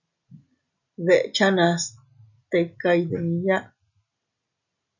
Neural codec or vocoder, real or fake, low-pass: none; real; 7.2 kHz